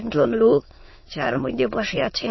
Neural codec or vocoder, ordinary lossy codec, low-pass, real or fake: autoencoder, 22.05 kHz, a latent of 192 numbers a frame, VITS, trained on many speakers; MP3, 24 kbps; 7.2 kHz; fake